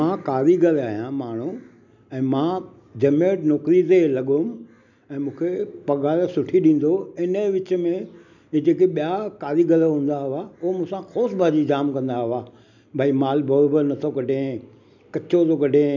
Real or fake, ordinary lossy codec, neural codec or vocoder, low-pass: real; none; none; 7.2 kHz